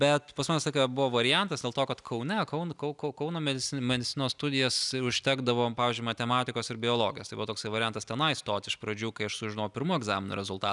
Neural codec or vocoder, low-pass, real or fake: none; 10.8 kHz; real